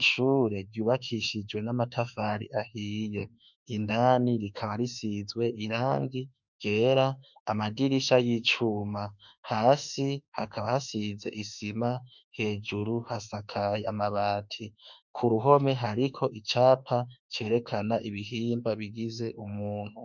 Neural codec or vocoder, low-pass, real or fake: autoencoder, 48 kHz, 32 numbers a frame, DAC-VAE, trained on Japanese speech; 7.2 kHz; fake